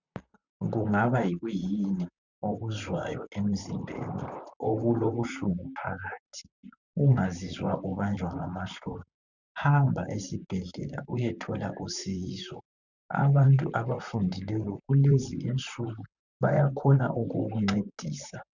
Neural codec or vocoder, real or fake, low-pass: vocoder, 44.1 kHz, 128 mel bands, Pupu-Vocoder; fake; 7.2 kHz